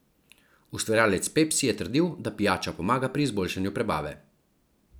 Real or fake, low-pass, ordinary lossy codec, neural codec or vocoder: real; none; none; none